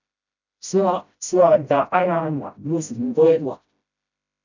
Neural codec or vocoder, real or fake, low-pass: codec, 16 kHz, 0.5 kbps, FreqCodec, smaller model; fake; 7.2 kHz